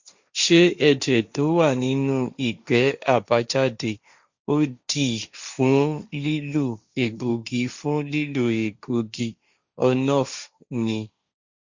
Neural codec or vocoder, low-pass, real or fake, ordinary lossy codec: codec, 16 kHz, 1.1 kbps, Voila-Tokenizer; 7.2 kHz; fake; Opus, 64 kbps